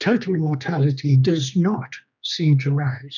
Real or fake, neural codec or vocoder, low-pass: fake; codec, 16 kHz, 2 kbps, X-Codec, HuBERT features, trained on general audio; 7.2 kHz